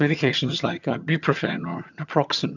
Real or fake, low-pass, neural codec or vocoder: fake; 7.2 kHz; vocoder, 22.05 kHz, 80 mel bands, HiFi-GAN